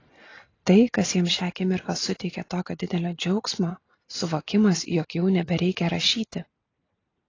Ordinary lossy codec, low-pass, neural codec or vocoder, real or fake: AAC, 32 kbps; 7.2 kHz; none; real